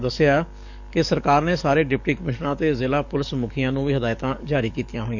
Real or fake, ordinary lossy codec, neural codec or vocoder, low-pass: fake; none; codec, 44.1 kHz, 7.8 kbps, DAC; 7.2 kHz